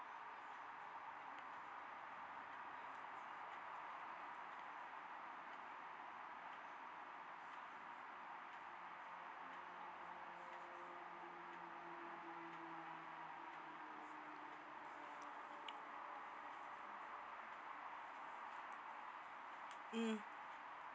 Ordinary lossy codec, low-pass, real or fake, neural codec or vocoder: none; none; real; none